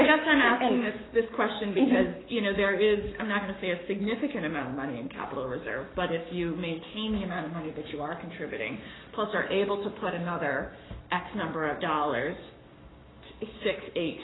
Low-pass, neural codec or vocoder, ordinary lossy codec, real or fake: 7.2 kHz; codec, 44.1 kHz, 7.8 kbps, Pupu-Codec; AAC, 16 kbps; fake